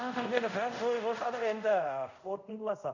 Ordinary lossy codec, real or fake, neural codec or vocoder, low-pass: none; fake; codec, 24 kHz, 0.5 kbps, DualCodec; 7.2 kHz